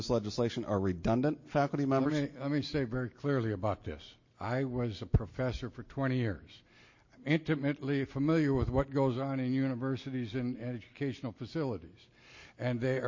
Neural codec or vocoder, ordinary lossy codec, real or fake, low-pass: none; MP3, 32 kbps; real; 7.2 kHz